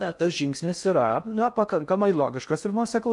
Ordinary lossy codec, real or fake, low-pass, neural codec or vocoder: MP3, 96 kbps; fake; 10.8 kHz; codec, 16 kHz in and 24 kHz out, 0.6 kbps, FocalCodec, streaming, 2048 codes